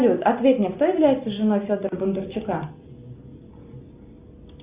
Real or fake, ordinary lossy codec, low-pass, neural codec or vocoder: real; Opus, 64 kbps; 3.6 kHz; none